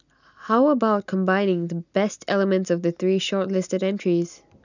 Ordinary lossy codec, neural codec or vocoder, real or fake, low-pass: none; none; real; 7.2 kHz